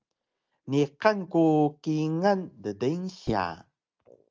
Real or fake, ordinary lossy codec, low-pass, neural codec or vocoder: real; Opus, 24 kbps; 7.2 kHz; none